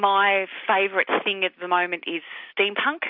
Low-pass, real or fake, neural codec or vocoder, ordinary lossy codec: 5.4 kHz; real; none; MP3, 48 kbps